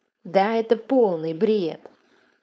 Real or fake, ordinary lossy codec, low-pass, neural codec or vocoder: fake; none; none; codec, 16 kHz, 4.8 kbps, FACodec